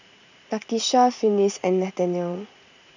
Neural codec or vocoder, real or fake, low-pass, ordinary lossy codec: vocoder, 44.1 kHz, 80 mel bands, Vocos; fake; 7.2 kHz; none